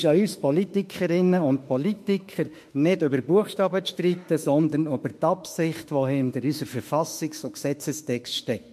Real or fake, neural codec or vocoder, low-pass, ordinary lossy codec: fake; autoencoder, 48 kHz, 32 numbers a frame, DAC-VAE, trained on Japanese speech; 14.4 kHz; MP3, 64 kbps